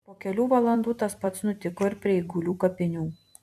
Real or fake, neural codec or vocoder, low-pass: fake; vocoder, 44.1 kHz, 128 mel bands every 256 samples, BigVGAN v2; 14.4 kHz